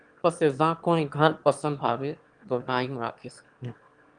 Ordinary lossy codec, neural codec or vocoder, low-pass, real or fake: Opus, 24 kbps; autoencoder, 22.05 kHz, a latent of 192 numbers a frame, VITS, trained on one speaker; 9.9 kHz; fake